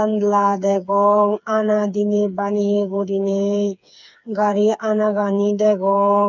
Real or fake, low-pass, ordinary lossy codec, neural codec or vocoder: fake; 7.2 kHz; none; codec, 16 kHz, 4 kbps, FreqCodec, smaller model